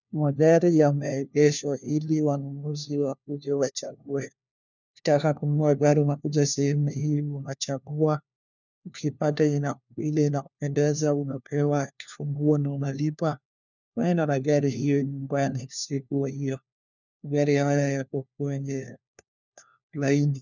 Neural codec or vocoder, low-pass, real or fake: codec, 16 kHz, 1 kbps, FunCodec, trained on LibriTTS, 50 frames a second; 7.2 kHz; fake